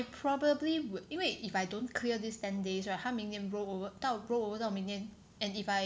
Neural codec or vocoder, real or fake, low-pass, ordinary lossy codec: none; real; none; none